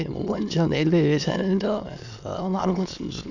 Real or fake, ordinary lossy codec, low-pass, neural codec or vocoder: fake; none; 7.2 kHz; autoencoder, 22.05 kHz, a latent of 192 numbers a frame, VITS, trained on many speakers